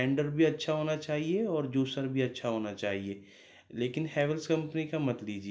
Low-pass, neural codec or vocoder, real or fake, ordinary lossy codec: none; none; real; none